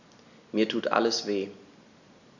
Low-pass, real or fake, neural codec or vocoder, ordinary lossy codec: 7.2 kHz; real; none; none